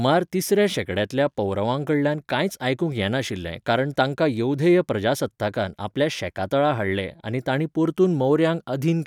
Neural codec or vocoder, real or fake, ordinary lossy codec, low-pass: none; real; none; 19.8 kHz